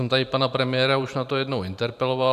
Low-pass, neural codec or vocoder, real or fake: 14.4 kHz; vocoder, 44.1 kHz, 128 mel bands every 512 samples, BigVGAN v2; fake